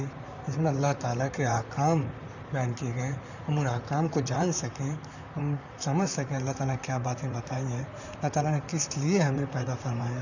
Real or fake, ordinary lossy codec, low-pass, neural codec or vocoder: fake; none; 7.2 kHz; vocoder, 44.1 kHz, 128 mel bands, Pupu-Vocoder